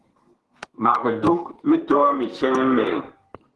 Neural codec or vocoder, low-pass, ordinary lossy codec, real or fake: codec, 32 kHz, 1.9 kbps, SNAC; 10.8 kHz; Opus, 16 kbps; fake